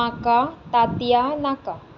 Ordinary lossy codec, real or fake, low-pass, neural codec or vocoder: none; real; 7.2 kHz; none